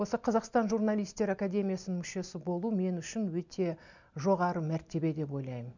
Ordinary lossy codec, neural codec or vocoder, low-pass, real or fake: none; none; 7.2 kHz; real